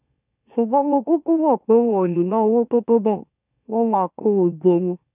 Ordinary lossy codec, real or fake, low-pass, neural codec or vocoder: none; fake; 3.6 kHz; autoencoder, 44.1 kHz, a latent of 192 numbers a frame, MeloTTS